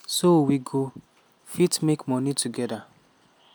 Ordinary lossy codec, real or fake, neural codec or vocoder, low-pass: none; real; none; none